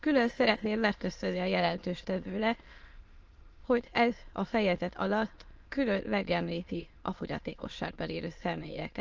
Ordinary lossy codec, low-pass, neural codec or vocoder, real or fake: Opus, 24 kbps; 7.2 kHz; autoencoder, 22.05 kHz, a latent of 192 numbers a frame, VITS, trained on many speakers; fake